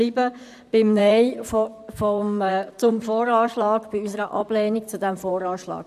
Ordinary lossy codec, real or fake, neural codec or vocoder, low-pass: none; fake; vocoder, 44.1 kHz, 128 mel bands, Pupu-Vocoder; 14.4 kHz